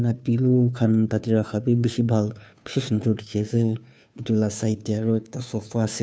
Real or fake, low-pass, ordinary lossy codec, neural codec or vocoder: fake; none; none; codec, 16 kHz, 2 kbps, FunCodec, trained on Chinese and English, 25 frames a second